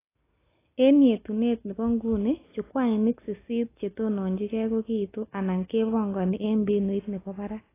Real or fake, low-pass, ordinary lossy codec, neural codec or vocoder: real; 3.6 kHz; AAC, 16 kbps; none